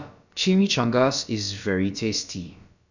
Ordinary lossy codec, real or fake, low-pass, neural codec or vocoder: none; fake; 7.2 kHz; codec, 16 kHz, about 1 kbps, DyCAST, with the encoder's durations